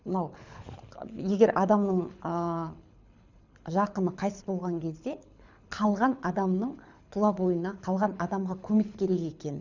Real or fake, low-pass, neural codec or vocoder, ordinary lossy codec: fake; 7.2 kHz; codec, 24 kHz, 6 kbps, HILCodec; none